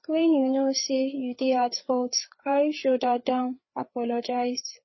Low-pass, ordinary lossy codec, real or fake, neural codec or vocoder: 7.2 kHz; MP3, 24 kbps; fake; codec, 16 kHz, 4 kbps, FreqCodec, larger model